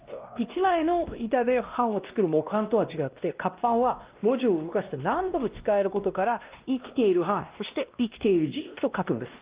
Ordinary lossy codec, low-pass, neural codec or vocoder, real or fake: Opus, 32 kbps; 3.6 kHz; codec, 16 kHz, 1 kbps, X-Codec, WavLM features, trained on Multilingual LibriSpeech; fake